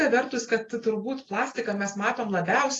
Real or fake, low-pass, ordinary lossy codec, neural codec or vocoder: real; 10.8 kHz; AAC, 32 kbps; none